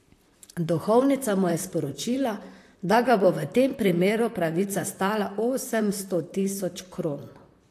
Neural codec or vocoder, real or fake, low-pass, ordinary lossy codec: vocoder, 44.1 kHz, 128 mel bands, Pupu-Vocoder; fake; 14.4 kHz; AAC, 64 kbps